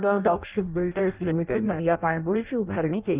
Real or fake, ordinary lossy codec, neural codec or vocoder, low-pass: fake; Opus, 24 kbps; codec, 16 kHz in and 24 kHz out, 0.6 kbps, FireRedTTS-2 codec; 3.6 kHz